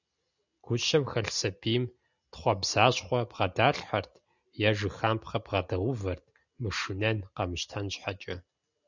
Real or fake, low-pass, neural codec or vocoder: real; 7.2 kHz; none